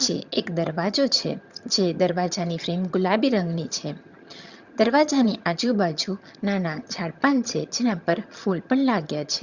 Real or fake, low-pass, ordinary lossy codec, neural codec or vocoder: fake; 7.2 kHz; Opus, 64 kbps; vocoder, 22.05 kHz, 80 mel bands, HiFi-GAN